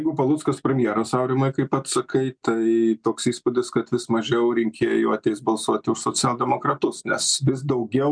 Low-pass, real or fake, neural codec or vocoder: 9.9 kHz; real; none